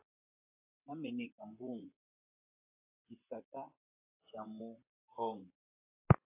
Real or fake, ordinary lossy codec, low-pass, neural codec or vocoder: fake; AAC, 32 kbps; 3.6 kHz; vocoder, 44.1 kHz, 128 mel bands, Pupu-Vocoder